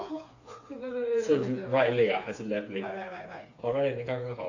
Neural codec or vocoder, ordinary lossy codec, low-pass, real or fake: codec, 16 kHz, 4 kbps, FreqCodec, smaller model; AAC, 48 kbps; 7.2 kHz; fake